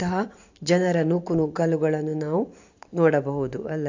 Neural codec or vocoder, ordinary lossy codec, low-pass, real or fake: vocoder, 44.1 kHz, 128 mel bands every 512 samples, BigVGAN v2; AAC, 48 kbps; 7.2 kHz; fake